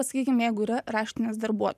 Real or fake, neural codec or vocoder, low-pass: fake; vocoder, 44.1 kHz, 128 mel bands every 512 samples, BigVGAN v2; 14.4 kHz